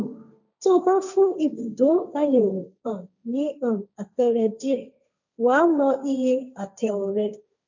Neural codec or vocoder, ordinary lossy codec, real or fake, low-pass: codec, 16 kHz, 1.1 kbps, Voila-Tokenizer; none; fake; 7.2 kHz